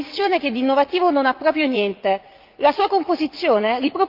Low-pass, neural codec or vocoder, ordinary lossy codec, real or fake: 5.4 kHz; vocoder, 44.1 kHz, 80 mel bands, Vocos; Opus, 32 kbps; fake